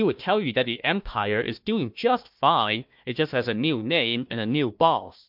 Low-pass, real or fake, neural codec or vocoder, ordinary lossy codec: 5.4 kHz; fake; codec, 16 kHz, 1 kbps, FunCodec, trained on Chinese and English, 50 frames a second; MP3, 48 kbps